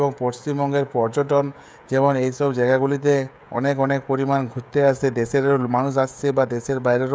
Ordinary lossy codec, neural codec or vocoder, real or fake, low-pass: none; codec, 16 kHz, 16 kbps, FreqCodec, smaller model; fake; none